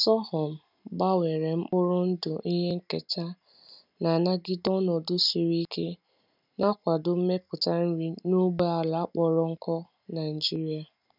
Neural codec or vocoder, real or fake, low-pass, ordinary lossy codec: none; real; 5.4 kHz; none